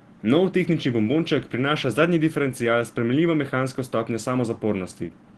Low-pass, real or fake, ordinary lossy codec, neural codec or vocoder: 10.8 kHz; real; Opus, 16 kbps; none